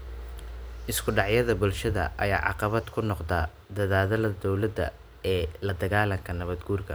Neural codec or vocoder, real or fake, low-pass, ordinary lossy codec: vocoder, 44.1 kHz, 128 mel bands every 512 samples, BigVGAN v2; fake; none; none